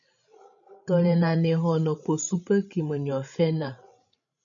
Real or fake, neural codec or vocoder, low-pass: fake; codec, 16 kHz, 16 kbps, FreqCodec, larger model; 7.2 kHz